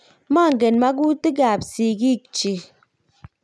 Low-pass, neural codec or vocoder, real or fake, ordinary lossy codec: none; none; real; none